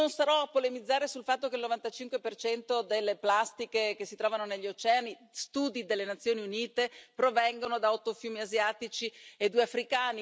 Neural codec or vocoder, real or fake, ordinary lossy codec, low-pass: none; real; none; none